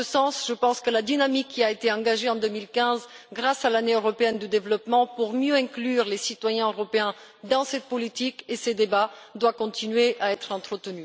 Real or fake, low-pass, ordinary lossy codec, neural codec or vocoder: real; none; none; none